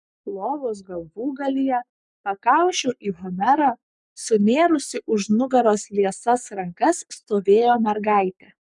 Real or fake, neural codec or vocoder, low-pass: fake; codec, 44.1 kHz, 7.8 kbps, Pupu-Codec; 10.8 kHz